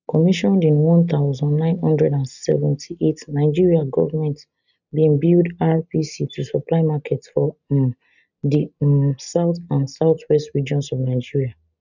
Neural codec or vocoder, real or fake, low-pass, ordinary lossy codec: none; real; 7.2 kHz; none